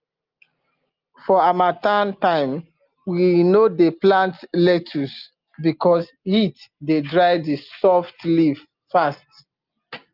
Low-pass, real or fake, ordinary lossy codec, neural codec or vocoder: 5.4 kHz; real; Opus, 24 kbps; none